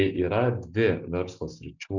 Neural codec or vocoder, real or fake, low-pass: none; real; 7.2 kHz